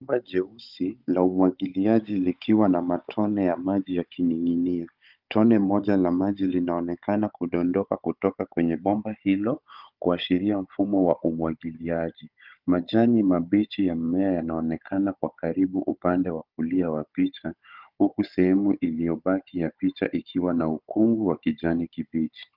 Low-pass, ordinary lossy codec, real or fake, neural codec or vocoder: 5.4 kHz; Opus, 32 kbps; fake; codec, 16 kHz, 16 kbps, FunCodec, trained on Chinese and English, 50 frames a second